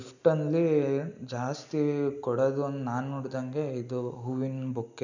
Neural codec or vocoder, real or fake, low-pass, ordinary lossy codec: none; real; 7.2 kHz; none